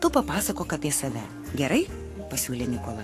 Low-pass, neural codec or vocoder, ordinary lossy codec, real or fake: 14.4 kHz; codec, 44.1 kHz, 7.8 kbps, Pupu-Codec; AAC, 64 kbps; fake